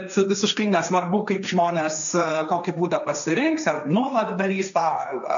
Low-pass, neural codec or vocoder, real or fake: 7.2 kHz; codec, 16 kHz, 1.1 kbps, Voila-Tokenizer; fake